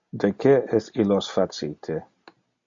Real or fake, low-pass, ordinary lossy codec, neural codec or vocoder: real; 7.2 kHz; MP3, 48 kbps; none